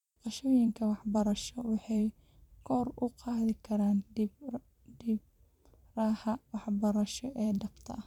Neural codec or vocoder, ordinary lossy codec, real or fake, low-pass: vocoder, 44.1 kHz, 128 mel bands every 512 samples, BigVGAN v2; none; fake; 19.8 kHz